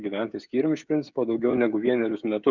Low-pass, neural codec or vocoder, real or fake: 7.2 kHz; codec, 16 kHz, 16 kbps, FreqCodec, smaller model; fake